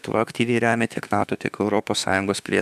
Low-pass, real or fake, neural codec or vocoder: 14.4 kHz; fake; autoencoder, 48 kHz, 32 numbers a frame, DAC-VAE, trained on Japanese speech